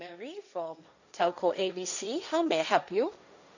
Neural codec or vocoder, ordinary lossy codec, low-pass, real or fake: codec, 16 kHz, 1.1 kbps, Voila-Tokenizer; none; 7.2 kHz; fake